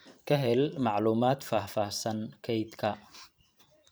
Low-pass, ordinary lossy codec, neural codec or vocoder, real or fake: none; none; vocoder, 44.1 kHz, 128 mel bands every 512 samples, BigVGAN v2; fake